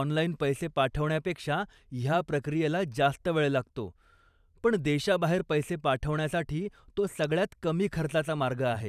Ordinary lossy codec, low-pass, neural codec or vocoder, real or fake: none; 14.4 kHz; vocoder, 44.1 kHz, 128 mel bands every 512 samples, BigVGAN v2; fake